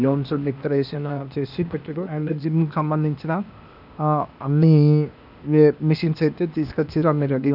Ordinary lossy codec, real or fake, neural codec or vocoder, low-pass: none; fake; codec, 16 kHz, 0.8 kbps, ZipCodec; 5.4 kHz